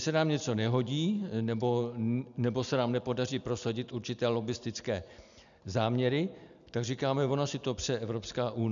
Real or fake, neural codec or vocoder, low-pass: real; none; 7.2 kHz